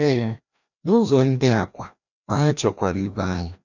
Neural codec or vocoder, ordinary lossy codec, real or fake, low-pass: codec, 16 kHz, 1 kbps, FreqCodec, larger model; none; fake; 7.2 kHz